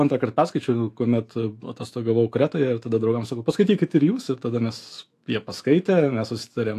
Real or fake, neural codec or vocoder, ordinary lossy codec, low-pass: fake; autoencoder, 48 kHz, 128 numbers a frame, DAC-VAE, trained on Japanese speech; AAC, 64 kbps; 14.4 kHz